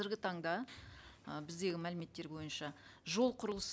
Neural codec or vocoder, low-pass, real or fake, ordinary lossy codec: none; none; real; none